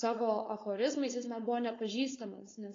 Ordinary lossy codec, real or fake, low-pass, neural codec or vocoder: AAC, 32 kbps; fake; 7.2 kHz; codec, 16 kHz, 4.8 kbps, FACodec